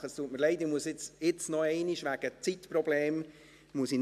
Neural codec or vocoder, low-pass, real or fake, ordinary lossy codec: none; 14.4 kHz; real; MP3, 96 kbps